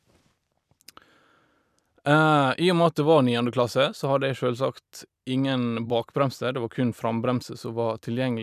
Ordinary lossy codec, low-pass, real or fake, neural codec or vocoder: none; 14.4 kHz; fake; vocoder, 44.1 kHz, 128 mel bands every 256 samples, BigVGAN v2